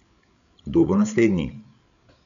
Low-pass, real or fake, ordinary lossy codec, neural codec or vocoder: 7.2 kHz; fake; none; codec, 16 kHz, 8 kbps, FreqCodec, larger model